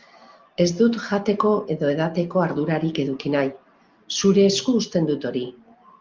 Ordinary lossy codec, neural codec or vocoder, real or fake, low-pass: Opus, 24 kbps; none; real; 7.2 kHz